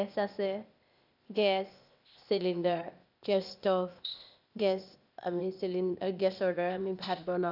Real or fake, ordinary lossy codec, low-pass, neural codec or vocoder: fake; none; 5.4 kHz; codec, 16 kHz, 0.8 kbps, ZipCodec